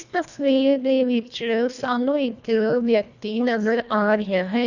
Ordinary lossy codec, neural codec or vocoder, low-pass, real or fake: none; codec, 24 kHz, 1.5 kbps, HILCodec; 7.2 kHz; fake